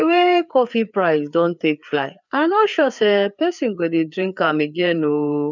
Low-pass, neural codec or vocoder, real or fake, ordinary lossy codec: 7.2 kHz; codec, 16 kHz, 4 kbps, FreqCodec, larger model; fake; none